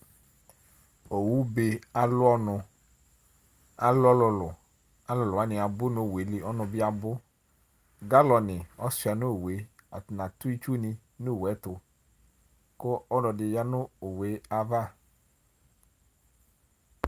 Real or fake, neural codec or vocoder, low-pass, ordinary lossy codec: real; none; 14.4 kHz; Opus, 32 kbps